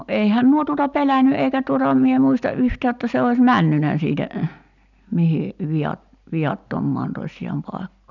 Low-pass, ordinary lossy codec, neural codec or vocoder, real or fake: 7.2 kHz; none; none; real